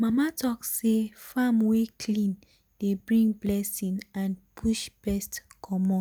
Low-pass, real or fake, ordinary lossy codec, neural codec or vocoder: none; real; none; none